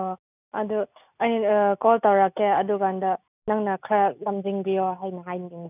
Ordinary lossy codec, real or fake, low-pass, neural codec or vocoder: none; real; 3.6 kHz; none